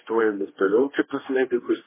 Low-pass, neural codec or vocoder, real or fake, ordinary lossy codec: 3.6 kHz; codec, 16 kHz, 1 kbps, X-Codec, HuBERT features, trained on general audio; fake; MP3, 16 kbps